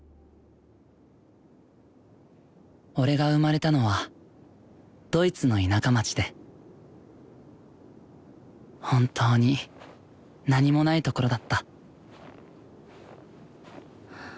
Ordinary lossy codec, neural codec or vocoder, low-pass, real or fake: none; none; none; real